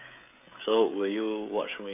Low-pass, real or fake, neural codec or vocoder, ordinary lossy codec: 3.6 kHz; fake; codec, 16 kHz, 16 kbps, FreqCodec, smaller model; none